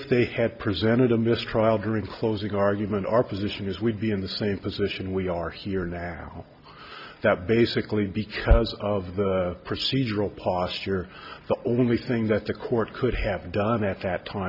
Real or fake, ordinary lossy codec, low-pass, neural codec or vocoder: real; Opus, 64 kbps; 5.4 kHz; none